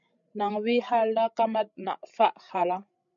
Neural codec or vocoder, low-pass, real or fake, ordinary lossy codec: codec, 16 kHz, 16 kbps, FreqCodec, larger model; 7.2 kHz; fake; MP3, 48 kbps